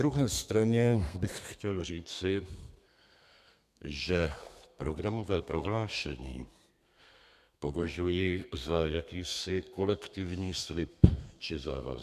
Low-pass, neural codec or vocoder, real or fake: 14.4 kHz; codec, 32 kHz, 1.9 kbps, SNAC; fake